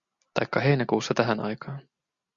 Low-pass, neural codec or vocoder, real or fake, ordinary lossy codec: 7.2 kHz; none; real; Opus, 64 kbps